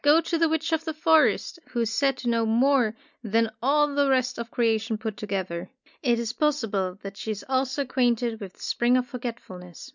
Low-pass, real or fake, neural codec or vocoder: 7.2 kHz; real; none